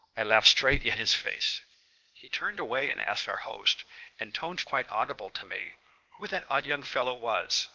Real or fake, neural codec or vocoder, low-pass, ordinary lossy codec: fake; codec, 16 kHz, 0.8 kbps, ZipCodec; 7.2 kHz; Opus, 32 kbps